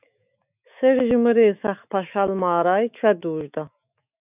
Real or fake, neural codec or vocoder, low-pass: fake; autoencoder, 48 kHz, 128 numbers a frame, DAC-VAE, trained on Japanese speech; 3.6 kHz